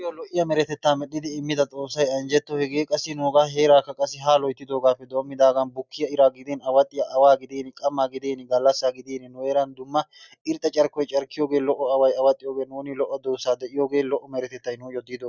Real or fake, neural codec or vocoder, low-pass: real; none; 7.2 kHz